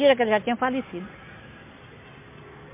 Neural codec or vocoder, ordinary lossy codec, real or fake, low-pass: none; MP3, 24 kbps; real; 3.6 kHz